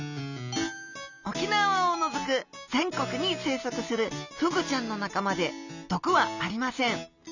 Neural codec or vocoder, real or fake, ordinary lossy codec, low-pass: none; real; none; 7.2 kHz